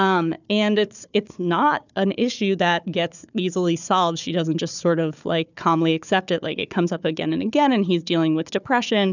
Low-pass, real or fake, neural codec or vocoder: 7.2 kHz; fake; codec, 16 kHz, 4 kbps, FunCodec, trained on Chinese and English, 50 frames a second